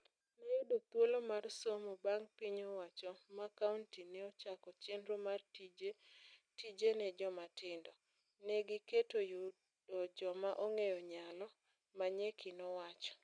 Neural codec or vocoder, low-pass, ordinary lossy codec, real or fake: none; 9.9 kHz; none; real